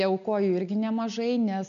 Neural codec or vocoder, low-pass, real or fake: none; 7.2 kHz; real